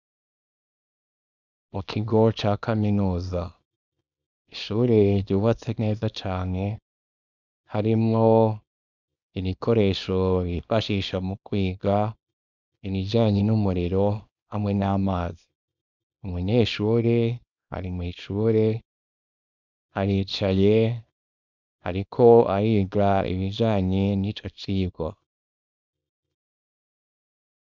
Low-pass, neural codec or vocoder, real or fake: 7.2 kHz; codec, 24 kHz, 0.9 kbps, WavTokenizer, small release; fake